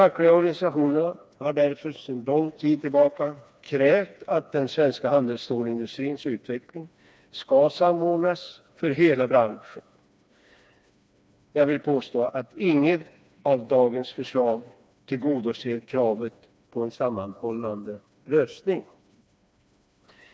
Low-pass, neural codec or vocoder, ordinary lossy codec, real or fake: none; codec, 16 kHz, 2 kbps, FreqCodec, smaller model; none; fake